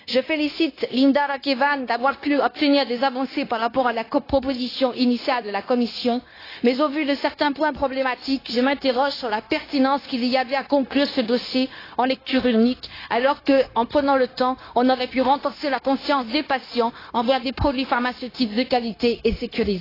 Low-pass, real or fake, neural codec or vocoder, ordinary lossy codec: 5.4 kHz; fake; codec, 16 kHz, 0.9 kbps, LongCat-Audio-Codec; AAC, 24 kbps